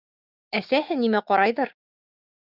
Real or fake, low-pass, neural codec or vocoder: fake; 5.4 kHz; codec, 16 kHz, 6 kbps, DAC